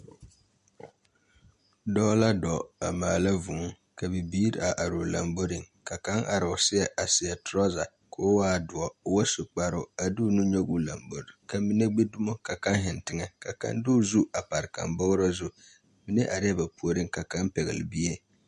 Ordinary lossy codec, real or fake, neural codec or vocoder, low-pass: MP3, 48 kbps; real; none; 14.4 kHz